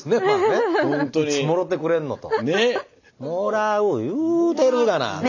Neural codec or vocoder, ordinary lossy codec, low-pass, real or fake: none; none; 7.2 kHz; real